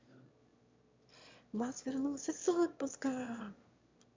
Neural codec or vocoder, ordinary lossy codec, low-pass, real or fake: autoencoder, 22.05 kHz, a latent of 192 numbers a frame, VITS, trained on one speaker; MP3, 64 kbps; 7.2 kHz; fake